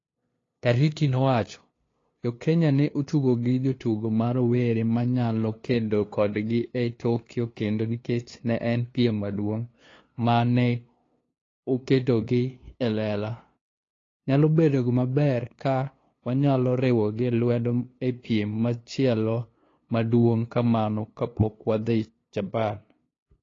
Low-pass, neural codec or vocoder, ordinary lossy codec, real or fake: 7.2 kHz; codec, 16 kHz, 2 kbps, FunCodec, trained on LibriTTS, 25 frames a second; AAC, 32 kbps; fake